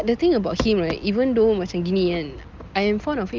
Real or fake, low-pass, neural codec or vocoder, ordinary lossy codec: real; 7.2 kHz; none; Opus, 32 kbps